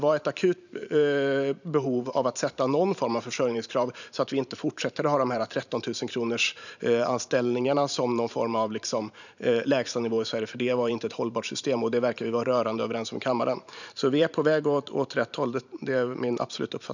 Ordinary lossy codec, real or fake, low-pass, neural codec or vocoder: none; fake; 7.2 kHz; vocoder, 44.1 kHz, 128 mel bands every 512 samples, BigVGAN v2